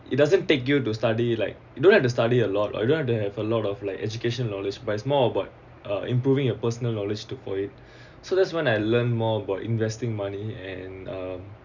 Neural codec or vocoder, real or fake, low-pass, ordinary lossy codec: none; real; 7.2 kHz; none